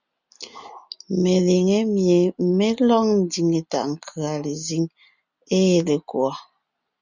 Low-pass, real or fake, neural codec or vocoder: 7.2 kHz; real; none